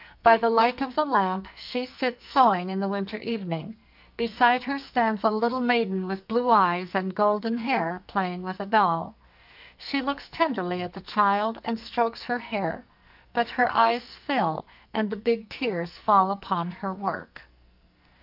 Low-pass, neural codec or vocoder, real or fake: 5.4 kHz; codec, 44.1 kHz, 2.6 kbps, SNAC; fake